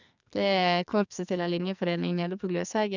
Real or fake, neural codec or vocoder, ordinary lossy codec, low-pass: fake; codec, 44.1 kHz, 2.6 kbps, SNAC; none; 7.2 kHz